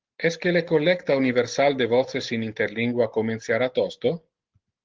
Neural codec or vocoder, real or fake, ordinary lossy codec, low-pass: none; real; Opus, 16 kbps; 7.2 kHz